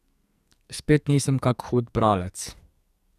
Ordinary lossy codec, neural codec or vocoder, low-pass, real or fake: none; codec, 44.1 kHz, 2.6 kbps, SNAC; 14.4 kHz; fake